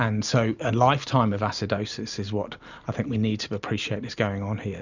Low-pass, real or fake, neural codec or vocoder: 7.2 kHz; real; none